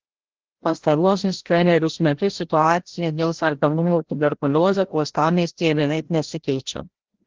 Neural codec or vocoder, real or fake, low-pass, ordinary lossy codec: codec, 16 kHz, 0.5 kbps, FreqCodec, larger model; fake; 7.2 kHz; Opus, 16 kbps